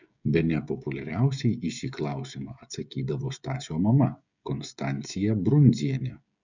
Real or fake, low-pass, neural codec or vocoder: fake; 7.2 kHz; codec, 16 kHz, 16 kbps, FreqCodec, smaller model